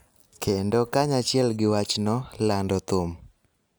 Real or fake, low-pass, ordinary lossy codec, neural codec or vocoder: real; none; none; none